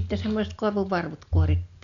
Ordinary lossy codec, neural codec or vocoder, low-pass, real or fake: none; none; 7.2 kHz; real